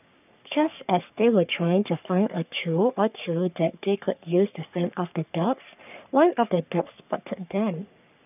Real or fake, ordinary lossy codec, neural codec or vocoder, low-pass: fake; none; codec, 44.1 kHz, 3.4 kbps, Pupu-Codec; 3.6 kHz